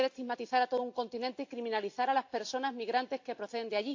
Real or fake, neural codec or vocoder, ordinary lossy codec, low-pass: real; none; AAC, 48 kbps; 7.2 kHz